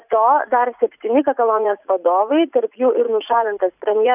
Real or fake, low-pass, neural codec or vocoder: fake; 3.6 kHz; vocoder, 44.1 kHz, 80 mel bands, Vocos